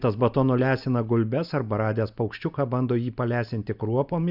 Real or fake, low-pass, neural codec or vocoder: real; 5.4 kHz; none